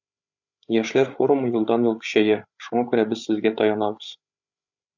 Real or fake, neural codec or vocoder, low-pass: fake; codec, 16 kHz, 8 kbps, FreqCodec, larger model; 7.2 kHz